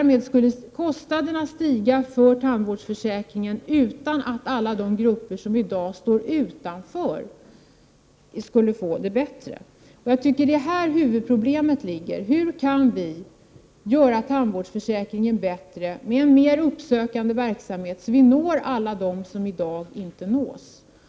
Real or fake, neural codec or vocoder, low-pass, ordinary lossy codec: real; none; none; none